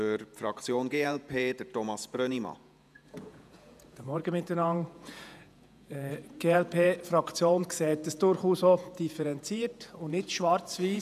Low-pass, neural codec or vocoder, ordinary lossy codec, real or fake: 14.4 kHz; vocoder, 48 kHz, 128 mel bands, Vocos; none; fake